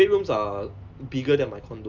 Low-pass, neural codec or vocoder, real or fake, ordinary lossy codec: 7.2 kHz; none; real; Opus, 24 kbps